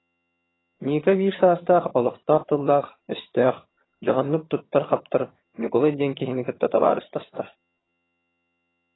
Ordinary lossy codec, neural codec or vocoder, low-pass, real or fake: AAC, 16 kbps; vocoder, 22.05 kHz, 80 mel bands, HiFi-GAN; 7.2 kHz; fake